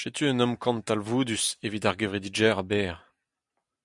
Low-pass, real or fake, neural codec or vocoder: 10.8 kHz; real; none